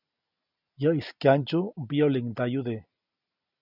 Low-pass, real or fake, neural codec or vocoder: 5.4 kHz; real; none